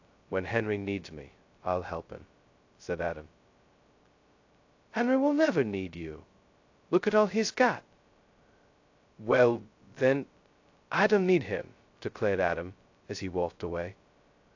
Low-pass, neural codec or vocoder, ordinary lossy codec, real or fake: 7.2 kHz; codec, 16 kHz, 0.2 kbps, FocalCodec; AAC, 48 kbps; fake